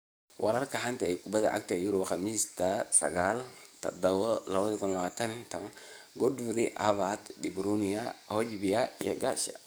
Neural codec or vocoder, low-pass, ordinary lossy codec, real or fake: codec, 44.1 kHz, 7.8 kbps, DAC; none; none; fake